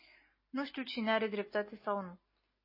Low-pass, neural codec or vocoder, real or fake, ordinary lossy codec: 5.4 kHz; none; real; MP3, 24 kbps